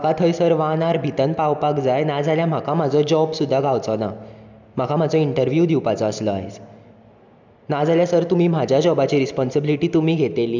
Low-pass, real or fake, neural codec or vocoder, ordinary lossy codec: 7.2 kHz; real; none; none